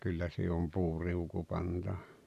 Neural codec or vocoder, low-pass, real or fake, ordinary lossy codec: none; 14.4 kHz; real; none